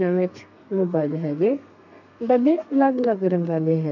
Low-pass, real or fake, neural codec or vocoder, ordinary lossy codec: 7.2 kHz; fake; codec, 32 kHz, 1.9 kbps, SNAC; none